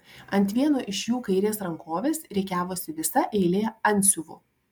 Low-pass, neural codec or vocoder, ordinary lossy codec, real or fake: 19.8 kHz; none; MP3, 96 kbps; real